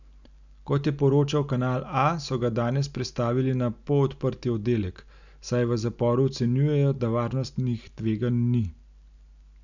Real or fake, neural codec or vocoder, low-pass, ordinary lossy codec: real; none; 7.2 kHz; none